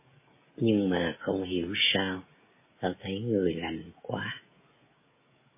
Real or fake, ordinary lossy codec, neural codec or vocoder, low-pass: fake; MP3, 16 kbps; codec, 16 kHz, 16 kbps, FreqCodec, smaller model; 3.6 kHz